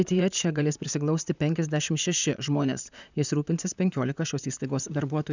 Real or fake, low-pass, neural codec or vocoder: fake; 7.2 kHz; vocoder, 44.1 kHz, 128 mel bands, Pupu-Vocoder